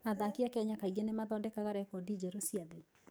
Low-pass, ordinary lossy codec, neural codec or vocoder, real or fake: none; none; codec, 44.1 kHz, 7.8 kbps, DAC; fake